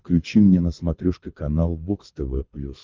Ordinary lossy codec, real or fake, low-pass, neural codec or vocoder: Opus, 32 kbps; fake; 7.2 kHz; codec, 24 kHz, 6 kbps, HILCodec